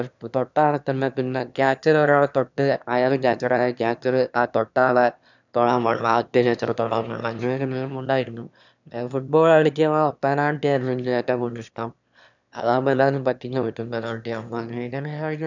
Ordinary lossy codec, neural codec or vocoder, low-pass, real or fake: none; autoencoder, 22.05 kHz, a latent of 192 numbers a frame, VITS, trained on one speaker; 7.2 kHz; fake